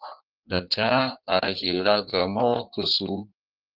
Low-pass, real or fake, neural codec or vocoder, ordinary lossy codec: 5.4 kHz; fake; codec, 16 kHz in and 24 kHz out, 1.1 kbps, FireRedTTS-2 codec; Opus, 32 kbps